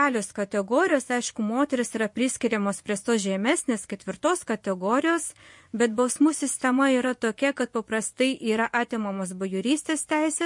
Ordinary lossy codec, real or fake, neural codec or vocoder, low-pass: MP3, 48 kbps; fake; vocoder, 24 kHz, 100 mel bands, Vocos; 10.8 kHz